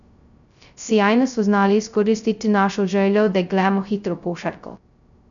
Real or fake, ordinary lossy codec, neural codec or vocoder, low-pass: fake; none; codec, 16 kHz, 0.2 kbps, FocalCodec; 7.2 kHz